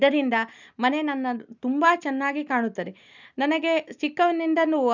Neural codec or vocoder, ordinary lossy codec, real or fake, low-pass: none; none; real; 7.2 kHz